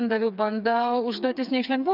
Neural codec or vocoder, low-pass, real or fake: codec, 16 kHz, 4 kbps, FreqCodec, smaller model; 5.4 kHz; fake